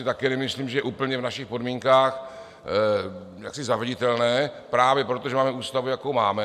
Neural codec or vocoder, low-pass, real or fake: none; 14.4 kHz; real